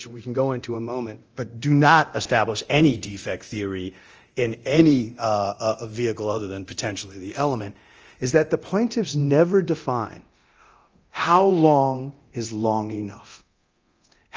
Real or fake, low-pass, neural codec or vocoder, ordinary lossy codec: fake; 7.2 kHz; codec, 24 kHz, 0.9 kbps, DualCodec; Opus, 24 kbps